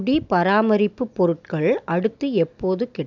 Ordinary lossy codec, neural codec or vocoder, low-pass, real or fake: none; none; 7.2 kHz; real